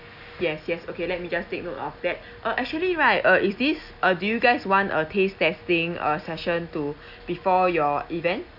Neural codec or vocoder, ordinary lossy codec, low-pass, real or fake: none; none; 5.4 kHz; real